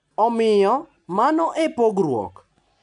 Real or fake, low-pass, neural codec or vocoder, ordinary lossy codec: real; 9.9 kHz; none; none